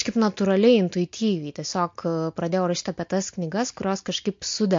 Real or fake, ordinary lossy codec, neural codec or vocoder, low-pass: real; MP3, 48 kbps; none; 7.2 kHz